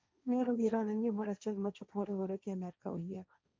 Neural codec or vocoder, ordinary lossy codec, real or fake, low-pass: codec, 16 kHz, 1.1 kbps, Voila-Tokenizer; none; fake; none